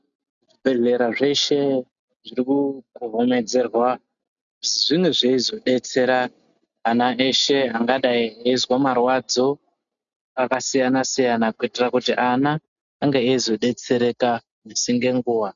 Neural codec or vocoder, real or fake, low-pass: none; real; 7.2 kHz